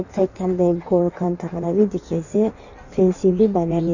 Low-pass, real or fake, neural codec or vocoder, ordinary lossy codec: 7.2 kHz; fake; codec, 16 kHz in and 24 kHz out, 1.1 kbps, FireRedTTS-2 codec; none